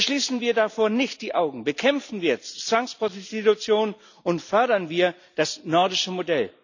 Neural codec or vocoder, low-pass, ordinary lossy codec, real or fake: none; 7.2 kHz; none; real